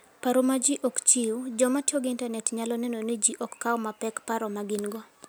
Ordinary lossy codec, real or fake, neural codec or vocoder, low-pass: none; real; none; none